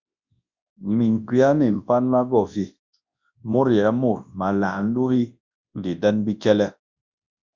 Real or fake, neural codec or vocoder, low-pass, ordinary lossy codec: fake; codec, 24 kHz, 0.9 kbps, WavTokenizer, large speech release; 7.2 kHz; Opus, 64 kbps